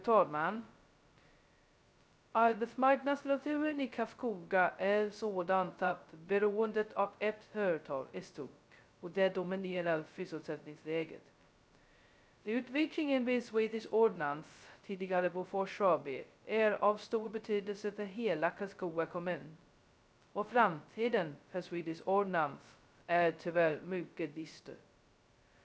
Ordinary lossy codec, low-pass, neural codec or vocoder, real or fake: none; none; codec, 16 kHz, 0.2 kbps, FocalCodec; fake